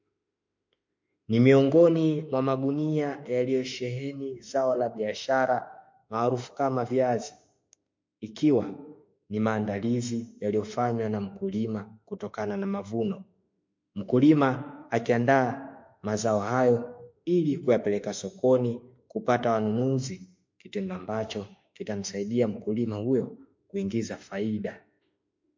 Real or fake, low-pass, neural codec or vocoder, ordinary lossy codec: fake; 7.2 kHz; autoencoder, 48 kHz, 32 numbers a frame, DAC-VAE, trained on Japanese speech; MP3, 48 kbps